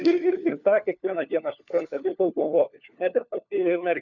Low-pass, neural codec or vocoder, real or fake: 7.2 kHz; codec, 16 kHz, 4 kbps, FunCodec, trained on LibriTTS, 50 frames a second; fake